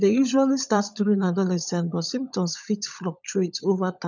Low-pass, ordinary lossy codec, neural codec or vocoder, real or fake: 7.2 kHz; none; codec, 16 kHz, 16 kbps, FunCodec, trained on LibriTTS, 50 frames a second; fake